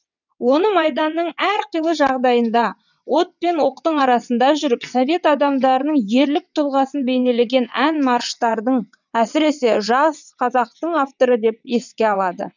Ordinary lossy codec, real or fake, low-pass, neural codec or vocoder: none; fake; 7.2 kHz; vocoder, 22.05 kHz, 80 mel bands, WaveNeXt